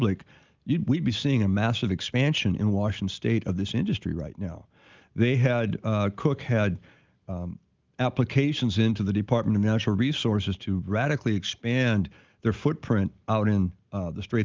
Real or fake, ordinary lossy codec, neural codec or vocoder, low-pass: real; Opus, 32 kbps; none; 7.2 kHz